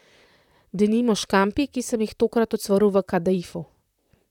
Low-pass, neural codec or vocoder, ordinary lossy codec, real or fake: 19.8 kHz; vocoder, 44.1 kHz, 128 mel bands, Pupu-Vocoder; none; fake